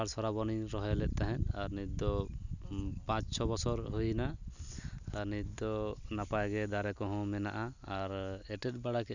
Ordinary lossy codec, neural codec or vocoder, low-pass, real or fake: none; none; 7.2 kHz; real